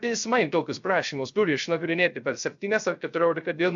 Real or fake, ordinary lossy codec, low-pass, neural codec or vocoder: fake; AAC, 64 kbps; 7.2 kHz; codec, 16 kHz, 0.3 kbps, FocalCodec